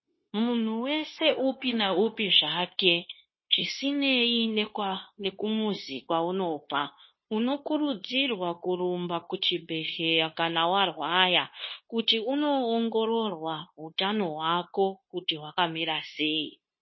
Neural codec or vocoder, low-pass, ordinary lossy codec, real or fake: codec, 16 kHz, 0.9 kbps, LongCat-Audio-Codec; 7.2 kHz; MP3, 24 kbps; fake